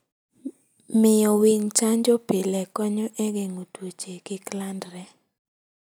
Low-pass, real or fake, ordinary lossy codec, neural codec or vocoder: none; real; none; none